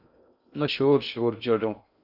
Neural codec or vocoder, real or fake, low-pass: codec, 16 kHz in and 24 kHz out, 0.6 kbps, FocalCodec, streaming, 2048 codes; fake; 5.4 kHz